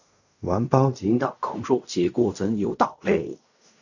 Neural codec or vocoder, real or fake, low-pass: codec, 16 kHz in and 24 kHz out, 0.4 kbps, LongCat-Audio-Codec, fine tuned four codebook decoder; fake; 7.2 kHz